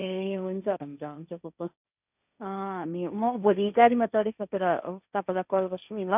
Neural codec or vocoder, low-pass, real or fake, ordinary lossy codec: codec, 16 kHz, 1.1 kbps, Voila-Tokenizer; 3.6 kHz; fake; none